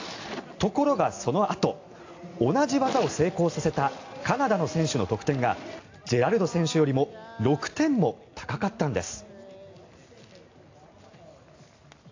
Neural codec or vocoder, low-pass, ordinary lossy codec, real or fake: none; 7.2 kHz; AAC, 48 kbps; real